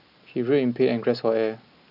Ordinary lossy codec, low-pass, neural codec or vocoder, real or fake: none; 5.4 kHz; none; real